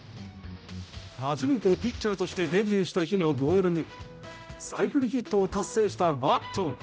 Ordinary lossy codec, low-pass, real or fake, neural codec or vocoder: none; none; fake; codec, 16 kHz, 0.5 kbps, X-Codec, HuBERT features, trained on general audio